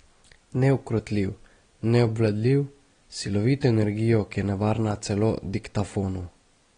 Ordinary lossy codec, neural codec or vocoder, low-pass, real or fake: AAC, 32 kbps; none; 9.9 kHz; real